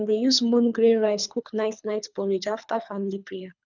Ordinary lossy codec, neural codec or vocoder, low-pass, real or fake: none; codec, 24 kHz, 3 kbps, HILCodec; 7.2 kHz; fake